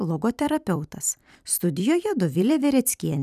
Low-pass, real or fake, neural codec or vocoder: 14.4 kHz; fake; vocoder, 44.1 kHz, 128 mel bands every 256 samples, BigVGAN v2